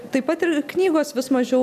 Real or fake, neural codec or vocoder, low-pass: real; none; 14.4 kHz